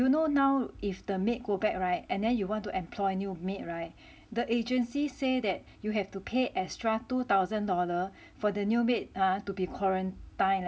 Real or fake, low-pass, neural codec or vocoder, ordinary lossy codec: real; none; none; none